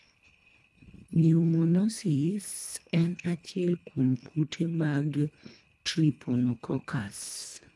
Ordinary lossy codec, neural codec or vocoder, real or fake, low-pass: none; codec, 24 kHz, 1.5 kbps, HILCodec; fake; none